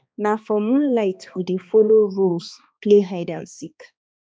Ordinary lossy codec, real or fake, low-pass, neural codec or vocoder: none; fake; none; codec, 16 kHz, 2 kbps, X-Codec, HuBERT features, trained on balanced general audio